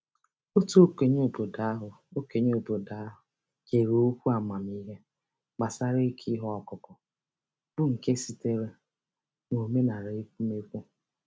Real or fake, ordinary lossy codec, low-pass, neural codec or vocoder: real; none; none; none